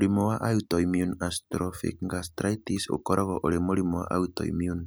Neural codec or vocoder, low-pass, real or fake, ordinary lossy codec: none; none; real; none